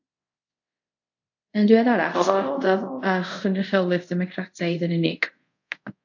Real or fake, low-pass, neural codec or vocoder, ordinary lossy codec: fake; 7.2 kHz; codec, 24 kHz, 0.5 kbps, DualCodec; AAC, 48 kbps